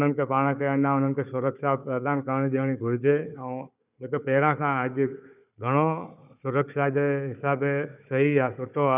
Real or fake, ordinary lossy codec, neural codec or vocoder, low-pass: fake; none; codec, 16 kHz, 4 kbps, FunCodec, trained on Chinese and English, 50 frames a second; 3.6 kHz